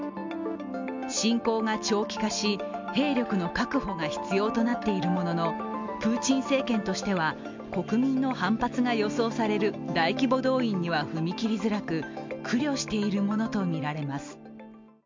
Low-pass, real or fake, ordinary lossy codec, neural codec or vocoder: 7.2 kHz; real; MP3, 64 kbps; none